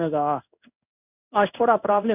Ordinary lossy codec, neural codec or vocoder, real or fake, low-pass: none; codec, 16 kHz in and 24 kHz out, 1 kbps, XY-Tokenizer; fake; 3.6 kHz